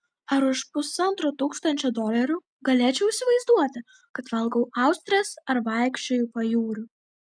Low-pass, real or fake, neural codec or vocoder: 9.9 kHz; real; none